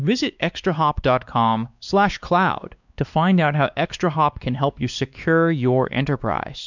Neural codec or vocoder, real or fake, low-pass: codec, 16 kHz, 2 kbps, X-Codec, WavLM features, trained on Multilingual LibriSpeech; fake; 7.2 kHz